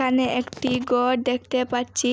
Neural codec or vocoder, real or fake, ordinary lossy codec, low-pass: none; real; none; none